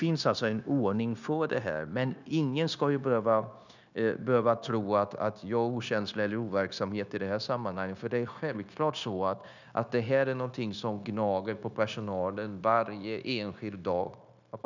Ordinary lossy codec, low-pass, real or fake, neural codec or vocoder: none; 7.2 kHz; fake; codec, 16 kHz, 0.9 kbps, LongCat-Audio-Codec